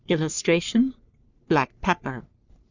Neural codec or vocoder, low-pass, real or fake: codec, 44.1 kHz, 3.4 kbps, Pupu-Codec; 7.2 kHz; fake